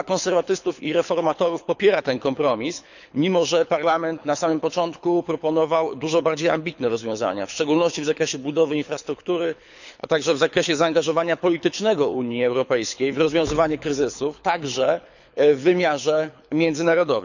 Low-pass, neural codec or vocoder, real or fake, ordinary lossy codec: 7.2 kHz; codec, 24 kHz, 6 kbps, HILCodec; fake; none